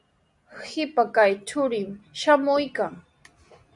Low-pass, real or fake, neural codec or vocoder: 10.8 kHz; real; none